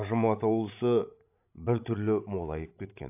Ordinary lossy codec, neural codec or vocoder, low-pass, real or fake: AAC, 32 kbps; codec, 16 kHz, 16 kbps, FreqCodec, larger model; 3.6 kHz; fake